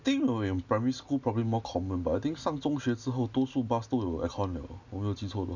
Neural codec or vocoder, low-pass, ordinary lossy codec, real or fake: none; 7.2 kHz; none; real